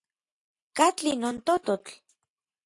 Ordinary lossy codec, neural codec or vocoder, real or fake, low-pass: AAC, 64 kbps; none; real; 10.8 kHz